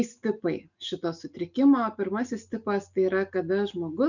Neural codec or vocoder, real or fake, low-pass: none; real; 7.2 kHz